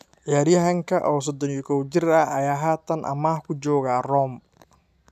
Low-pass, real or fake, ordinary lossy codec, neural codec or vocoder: 14.4 kHz; real; none; none